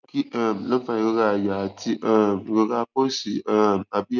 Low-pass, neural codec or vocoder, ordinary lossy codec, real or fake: 7.2 kHz; none; none; real